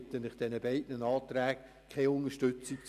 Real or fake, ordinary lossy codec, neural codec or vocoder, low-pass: real; none; none; 14.4 kHz